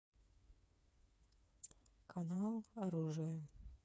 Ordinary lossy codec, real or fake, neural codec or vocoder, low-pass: none; fake; codec, 16 kHz, 4 kbps, FreqCodec, smaller model; none